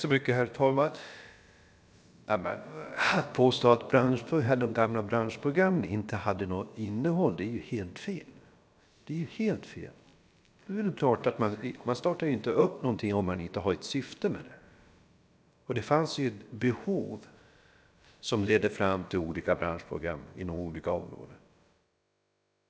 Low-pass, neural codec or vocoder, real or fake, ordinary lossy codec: none; codec, 16 kHz, about 1 kbps, DyCAST, with the encoder's durations; fake; none